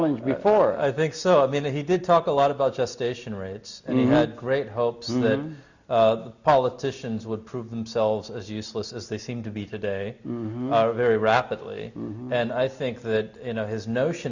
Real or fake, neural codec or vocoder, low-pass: real; none; 7.2 kHz